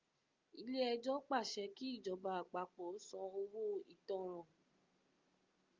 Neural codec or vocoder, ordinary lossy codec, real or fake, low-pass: none; Opus, 24 kbps; real; 7.2 kHz